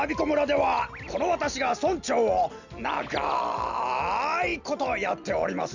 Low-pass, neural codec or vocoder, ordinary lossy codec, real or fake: 7.2 kHz; none; Opus, 64 kbps; real